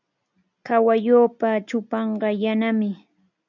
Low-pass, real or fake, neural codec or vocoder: 7.2 kHz; real; none